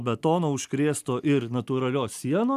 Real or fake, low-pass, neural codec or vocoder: real; 14.4 kHz; none